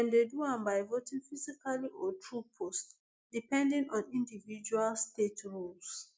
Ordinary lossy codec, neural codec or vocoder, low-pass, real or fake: none; none; none; real